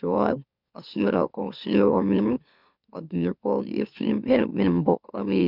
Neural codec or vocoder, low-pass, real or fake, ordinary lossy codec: autoencoder, 44.1 kHz, a latent of 192 numbers a frame, MeloTTS; 5.4 kHz; fake; none